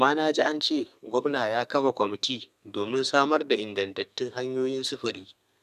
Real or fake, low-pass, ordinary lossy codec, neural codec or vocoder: fake; 14.4 kHz; none; codec, 32 kHz, 1.9 kbps, SNAC